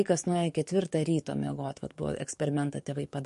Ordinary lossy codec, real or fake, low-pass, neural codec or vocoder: MP3, 48 kbps; fake; 14.4 kHz; codec, 44.1 kHz, 7.8 kbps, DAC